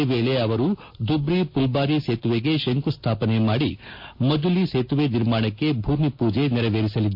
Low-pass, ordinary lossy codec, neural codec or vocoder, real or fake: 5.4 kHz; MP3, 32 kbps; none; real